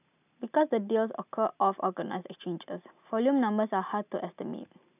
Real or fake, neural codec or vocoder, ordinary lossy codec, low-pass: real; none; none; 3.6 kHz